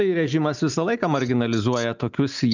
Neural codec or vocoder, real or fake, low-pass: none; real; 7.2 kHz